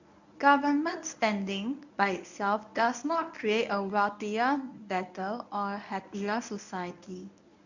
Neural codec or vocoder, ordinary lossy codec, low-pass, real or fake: codec, 24 kHz, 0.9 kbps, WavTokenizer, medium speech release version 1; none; 7.2 kHz; fake